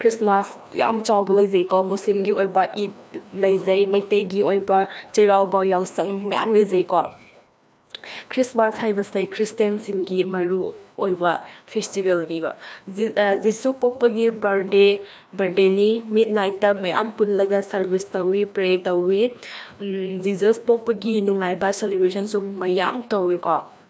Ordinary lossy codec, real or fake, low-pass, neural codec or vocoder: none; fake; none; codec, 16 kHz, 1 kbps, FreqCodec, larger model